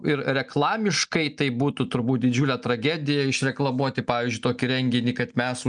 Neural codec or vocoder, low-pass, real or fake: none; 9.9 kHz; real